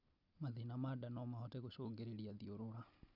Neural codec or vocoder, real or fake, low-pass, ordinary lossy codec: none; real; 5.4 kHz; none